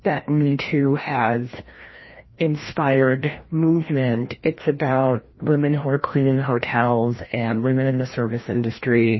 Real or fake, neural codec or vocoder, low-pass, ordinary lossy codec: fake; codec, 16 kHz, 1 kbps, FreqCodec, larger model; 7.2 kHz; MP3, 24 kbps